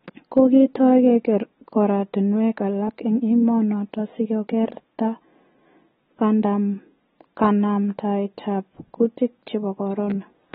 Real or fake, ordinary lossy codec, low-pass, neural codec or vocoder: real; AAC, 16 kbps; 7.2 kHz; none